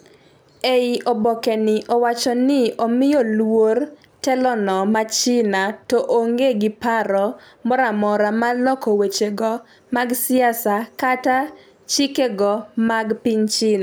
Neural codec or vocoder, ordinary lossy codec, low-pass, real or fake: none; none; none; real